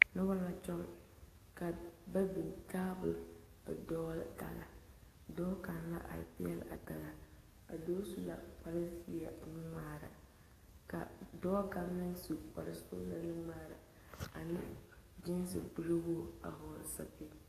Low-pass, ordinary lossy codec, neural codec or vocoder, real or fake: 14.4 kHz; AAC, 48 kbps; codec, 44.1 kHz, 7.8 kbps, DAC; fake